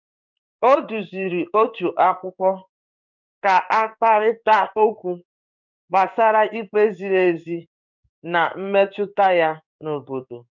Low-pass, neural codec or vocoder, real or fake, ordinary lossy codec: 7.2 kHz; codec, 16 kHz in and 24 kHz out, 1 kbps, XY-Tokenizer; fake; none